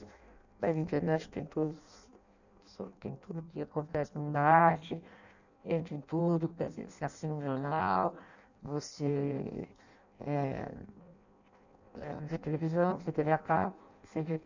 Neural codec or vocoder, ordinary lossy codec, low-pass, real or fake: codec, 16 kHz in and 24 kHz out, 0.6 kbps, FireRedTTS-2 codec; none; 7.2 kHz; fake